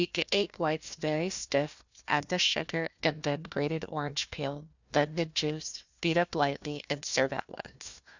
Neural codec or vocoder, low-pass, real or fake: codec, 16 kHz, 1 kbps, FunCodec, trained on Chinese and English, 50 frames a second; 7.2 kHz; fake